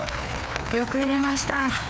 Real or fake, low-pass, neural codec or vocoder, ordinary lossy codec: fake; none; codec, 16 kHz, 2 kbps, FreqCodec, larger model; none